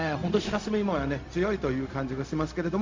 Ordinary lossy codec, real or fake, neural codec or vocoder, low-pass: MP3, 64 kbps; fake; codec, 16 kHz, 0.4 kbps, LongCat-Audio-Codec; 7.2 kHz